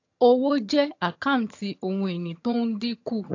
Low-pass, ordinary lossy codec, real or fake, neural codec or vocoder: 7.2 kHz; AAC, 48 kbps; fake; vocoder, 22.05 kHz, 80 mel bands, HiFi-GAN